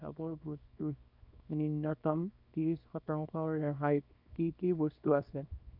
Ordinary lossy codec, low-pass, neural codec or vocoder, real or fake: none; 5.4 kHz; codec, 24 kHz, 0.9 kbps, WavTokenizer, small release; fake